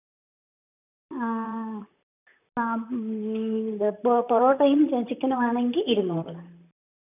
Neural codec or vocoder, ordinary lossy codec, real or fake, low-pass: vocoder, 44.1 kHz, 128 mel bands, Pupu-Vocoder; none; fake; 3.6 kHz